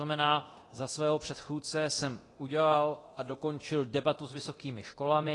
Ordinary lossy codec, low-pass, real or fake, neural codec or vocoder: AAC, 32 kbps; 10.8 kHz; fake; codec, 24 kHz, 0.9 kbps, DualCodec